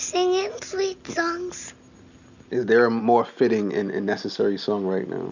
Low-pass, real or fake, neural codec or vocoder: 7.2 kHz; real; none